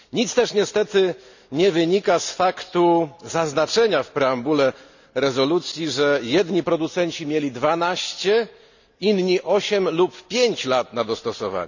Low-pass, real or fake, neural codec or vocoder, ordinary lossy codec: 7.2 kHz; real; none; none